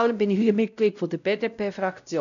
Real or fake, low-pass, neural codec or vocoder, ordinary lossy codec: fake; 7.2 kHz; codec, 16 kHz, 0.5 kbps, X-Codec, WavLM features, trained on Multilingual LibriSpeech; none